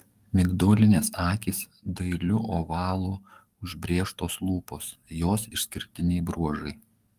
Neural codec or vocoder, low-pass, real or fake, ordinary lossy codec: codec, 44.1 kHz, 7.8 kbps, DAC; 14.4 kHz; fake; Opus, 32 kbps